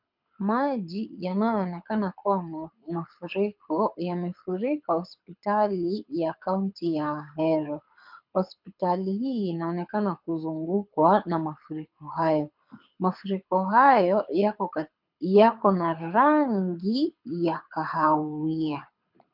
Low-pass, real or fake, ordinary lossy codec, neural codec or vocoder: 5.4 kHz; fake; MP3, 48 kbps; codec, 24 kHz, 6 kbps, HILCodec